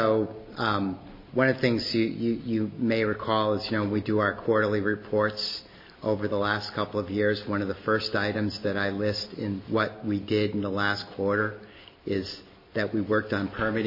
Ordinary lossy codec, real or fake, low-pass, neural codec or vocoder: MP3, 24 kbps; real; 5.4 kHz; none